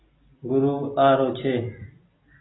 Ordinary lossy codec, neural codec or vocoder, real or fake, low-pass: AAC, 16 kbps; none; real; 7.2 kHz